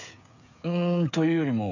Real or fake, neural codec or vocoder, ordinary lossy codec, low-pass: fake; codec, 16 kHz, 8 kbps, FreqCodec, smaller model; none; 7.2 kHz